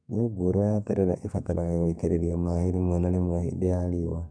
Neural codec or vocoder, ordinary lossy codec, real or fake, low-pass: codec, 32 kHz, 1.9 kbps, SNAC; none; fake; 9.9 kHz